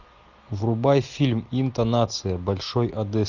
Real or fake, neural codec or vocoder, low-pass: real; none; 7.2 kHz